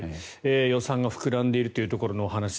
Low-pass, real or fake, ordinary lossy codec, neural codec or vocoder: none; real; none; none